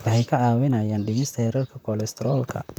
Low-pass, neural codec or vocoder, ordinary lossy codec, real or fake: none; vocoder, 44.1 kHz, 128 mel bands, Pupu-Vocoder; none; fake